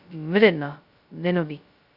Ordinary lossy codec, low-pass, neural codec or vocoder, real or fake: Opus, 64 kbps; 5.4 kHz; codec, 16 kHz, 0.2 kbps, FocalCodec; fake